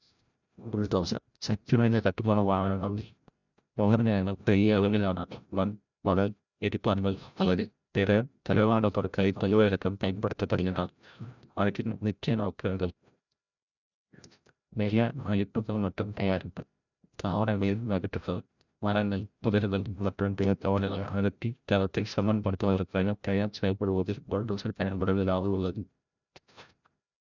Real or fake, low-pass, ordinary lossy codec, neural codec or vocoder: fake; 7.2 kHz; none; codec, 16 kHz, 0.5 kbps, FreqCodec, larger model